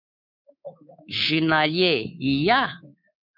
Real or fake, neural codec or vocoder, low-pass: fake; codec, 24 kHz, 3.1 kbps, DualCodec; 5.4 kHz